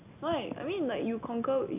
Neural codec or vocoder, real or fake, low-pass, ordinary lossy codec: none; real; 3.6 kHz; AAC, 32 kbps